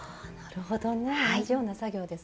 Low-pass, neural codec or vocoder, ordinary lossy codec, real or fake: none; none; none; real